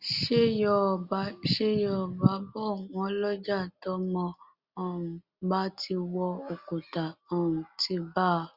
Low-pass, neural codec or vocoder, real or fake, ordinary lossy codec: 5.4 kHz; none; real; Opus, 64 kbps